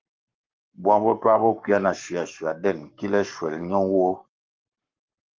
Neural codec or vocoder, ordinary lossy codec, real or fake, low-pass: codec, 44.1 kHz, 7.8 kbps, Pupu-Codec; Opus, 32 kbps; fake; 7.2 kHz